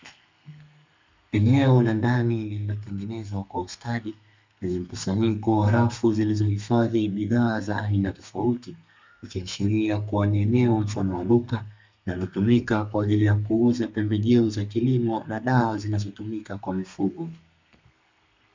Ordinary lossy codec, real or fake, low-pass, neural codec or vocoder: MP3, 64 kbps; fake; 7.2 kHz; codec, 32 kHz, 1.9 kbps, SNAC